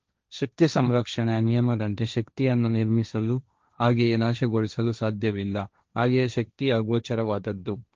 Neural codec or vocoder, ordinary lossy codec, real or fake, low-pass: codec, 16 kHz, 1.1 kbps, Voila-Tokenizer; Opus, 24 kbps; fake; 7.2 kHz